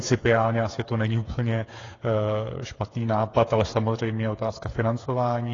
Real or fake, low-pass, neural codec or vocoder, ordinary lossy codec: fake; 7.2 kHz; codec, 16 kHz, 8 kbps, FreqCodec, smaller model; AAC, 32 kbps